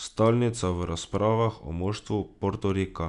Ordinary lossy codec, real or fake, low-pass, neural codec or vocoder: none; real; 10.8 kHz; none